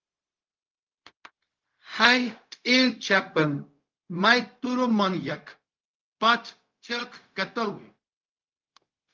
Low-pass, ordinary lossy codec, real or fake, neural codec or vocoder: 7.2 kHz; Opus, 24 kbps; fake; codec, 16 kHz, 0.4 kbps, LongCat-Audio-Codec